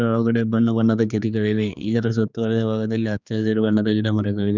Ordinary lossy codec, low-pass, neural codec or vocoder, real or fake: none; 7.2 kHz; codec, 16 kHz, 2 kbps, X-Codec, HuBERT features, trained on general audio; fake